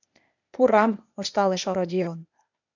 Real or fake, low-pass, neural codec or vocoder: fake; 7.2 kHz; codec, 16 kHz, 0.8 kbps, ZipCodec